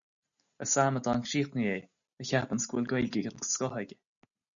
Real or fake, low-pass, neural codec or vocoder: real; 7.2 kHz; none